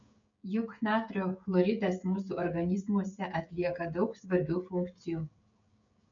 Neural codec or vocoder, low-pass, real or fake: codec, 16 kHz, 16 kbps, FreqCodec, smaller model; 7.2 kHz; fake